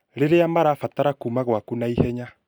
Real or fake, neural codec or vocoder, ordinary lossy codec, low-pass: real; none; none; none